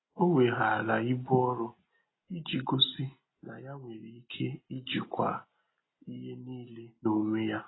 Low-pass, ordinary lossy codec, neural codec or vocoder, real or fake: 7.2 kHz; AAC, 16 kbps; none; real